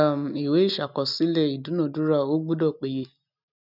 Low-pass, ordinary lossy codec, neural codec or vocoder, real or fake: 5.4 kHz; none; none; real